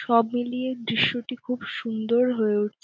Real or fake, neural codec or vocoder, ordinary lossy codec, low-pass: real; none; none; none